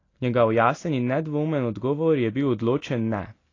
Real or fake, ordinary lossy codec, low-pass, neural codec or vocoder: real; AAC, 32 kbps; 7.2 kHz; none